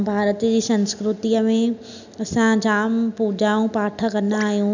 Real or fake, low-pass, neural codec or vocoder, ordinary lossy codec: real; 7.2 kHz; none; none